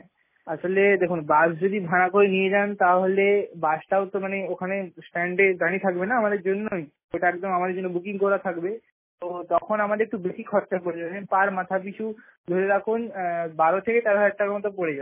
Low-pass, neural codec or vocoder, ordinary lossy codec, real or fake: 3.6 kHz; none; MP3, 16 kbps; real